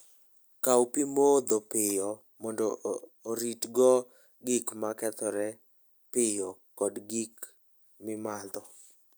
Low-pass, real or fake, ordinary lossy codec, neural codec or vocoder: none; real; none; none